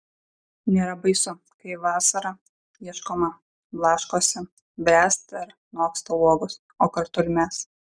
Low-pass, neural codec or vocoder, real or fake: 9.9 kHz; none; real